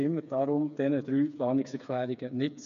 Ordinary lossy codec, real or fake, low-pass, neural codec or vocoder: none; fake; 7.2 kHz; codec, 16 kHz, 4 kbps, FreqCodec, smaller model